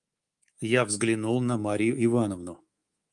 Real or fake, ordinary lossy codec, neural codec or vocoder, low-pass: fake; Opus, 32 kbps; codec, 24 kHz, 3.1 kbps, DualCodec; 10.8 kHz